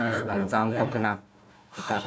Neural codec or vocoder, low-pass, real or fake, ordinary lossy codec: codec, 16 kHz, 1 kbps, FunCodec, trained on Chinese and English, 50 frames a second; none; fake; none